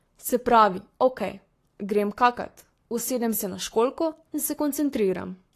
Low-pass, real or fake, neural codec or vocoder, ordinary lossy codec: 14.4 kHz; fake; codec, 44.1 kHz, 7.8 kbps, Pupu-Codec; AAC, 48 kbps